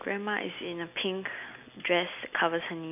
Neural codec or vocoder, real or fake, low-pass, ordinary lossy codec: none; real; 3.6 kHz; none